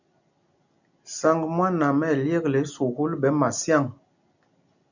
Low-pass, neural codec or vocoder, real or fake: 7.2 kHz; none; real